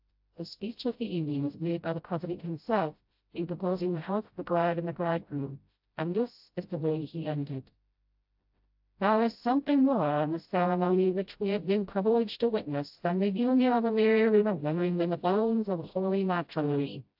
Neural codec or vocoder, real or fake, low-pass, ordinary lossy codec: codec, 16 kHz, 0.5 kbps, FreqCodec, smaller model; fake; 5.4 kHz; AAC, 48 kbps